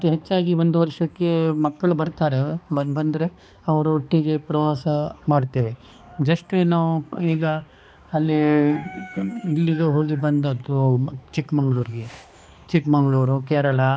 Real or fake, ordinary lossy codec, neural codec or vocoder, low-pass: fake; none; codec, 16 kHz, 2 kbps, X-Codec, HuBERT features, trained on balanced general audio; none